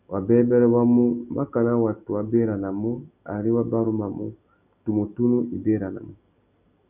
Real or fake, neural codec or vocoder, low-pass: real; none; 3.6 kHz